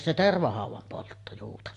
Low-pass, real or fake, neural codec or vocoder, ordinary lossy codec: 10.8 kHz; real; none; AAC, 48 kbps